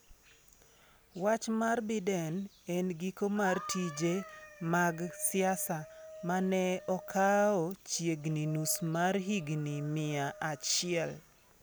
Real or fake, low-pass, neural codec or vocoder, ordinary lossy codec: real; none; none; none